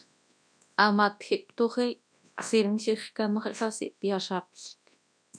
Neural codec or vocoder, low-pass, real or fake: codec, 24 kHz, 0.9 kbps, WavTokenizer, large speech release; 9.9 kHz; fake